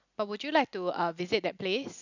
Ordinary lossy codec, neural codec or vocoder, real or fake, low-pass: none; none; real; 7.2 kHz